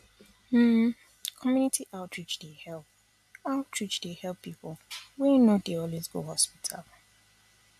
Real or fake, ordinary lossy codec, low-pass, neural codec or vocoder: real; AAC, 96 kbps; 14.4 kHz; none